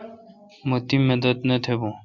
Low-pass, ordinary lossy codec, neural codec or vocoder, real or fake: 7.2 kHz; Opus, 64 kbps; none; real